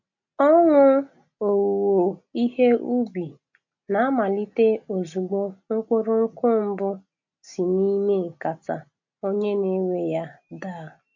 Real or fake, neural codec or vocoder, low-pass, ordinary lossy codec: real; none; 7.2 kHz; MP3, 48 kbps